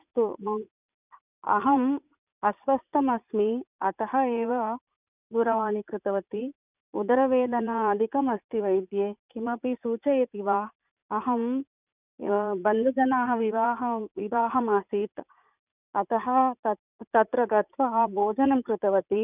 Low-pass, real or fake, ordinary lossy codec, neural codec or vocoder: 3.6 kHz; fake; none; vocoder, 44.1 kHz, 80 mel bands, Vocos